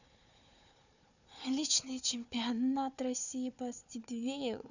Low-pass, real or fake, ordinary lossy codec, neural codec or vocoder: 7.2 kHz; fake; none; codec, 16 kHz, 4 kbps, FunCodec, trained on Chinese and English, 50 frames a second